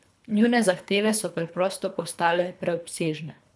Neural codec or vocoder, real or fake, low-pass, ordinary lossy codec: codec, 24 kHz, 3 kbps, HILCodec; fake; none; none